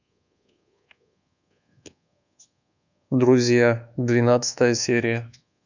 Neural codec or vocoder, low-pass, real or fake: codec, 24 kHz, 1.2 kbps, DualCodec; 7.2 kHz; fake